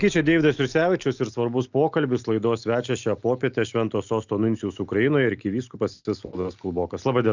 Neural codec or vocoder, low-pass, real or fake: none; 7.2 kHz; real